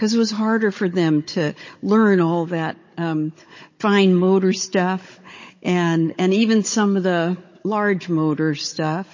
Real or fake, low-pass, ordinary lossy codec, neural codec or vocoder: fake; 7.2 kHz; MP3, 32 kbps; codec, 16 kHz, 16 kbps, FunCodec, trained on Chinese and English, 50 frames a second